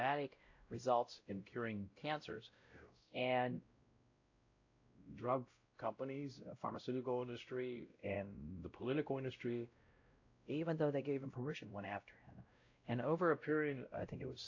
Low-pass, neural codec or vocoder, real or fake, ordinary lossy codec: 7.2 kHz; codec, 16 kHz, 0.5 kbps, X-Codec, WavLM features, trained on Multilingual LibriSpeech; fake; AAC, 48 kbps